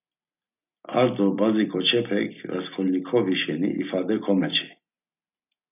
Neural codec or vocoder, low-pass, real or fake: none; 3.6 kHz; real